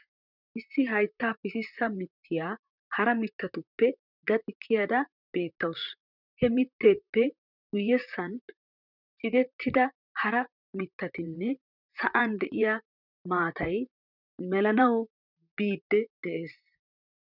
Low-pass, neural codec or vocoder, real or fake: 5.4 kHz; vocoder, 44.1 kHz, 128 mel bands, Pupu-Vocoder; fake